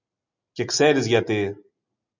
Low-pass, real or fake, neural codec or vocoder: 7.2 kHz; real; none